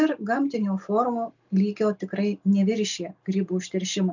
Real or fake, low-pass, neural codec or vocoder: real; 7.2 kHz; none